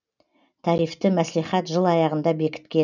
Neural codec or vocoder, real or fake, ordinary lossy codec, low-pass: none; real; none; 7.2 kHz